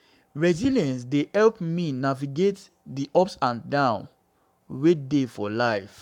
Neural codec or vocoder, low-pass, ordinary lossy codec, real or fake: codec, 44.1 kHz, 7.8 kbps, Pupu-Codec; 19.8 kHz; none; fake